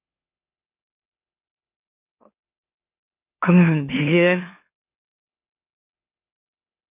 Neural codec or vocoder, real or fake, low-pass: autoencoder, 44.1 kHz, a latent of 192 numbers a frame, MeloTTS; fake; 3.6 kHz